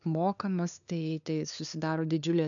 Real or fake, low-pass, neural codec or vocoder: fake; 7.2 kHz; codec, 16 kHz, 2 kbps, FunCodec, trained on LibriTTS, 25 frames a second